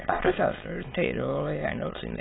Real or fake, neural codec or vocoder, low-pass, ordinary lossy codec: fake; autoencoder, 22.05 kHz, a latent of 192 numbers a frame, VITS, trained on many speakers; 7.2 kHz; AAC, 16 kbps